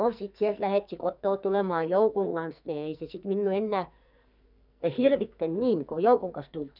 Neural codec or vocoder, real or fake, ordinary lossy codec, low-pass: codec, 44.1 kHz, 2.6 kbps, SNAC; fake; none; 5.4 kHz